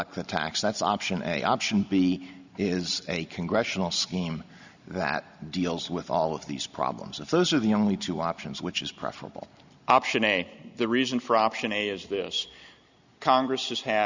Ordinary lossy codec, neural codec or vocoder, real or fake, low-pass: Opus, 64 kbps; none; real; 7.2 kHz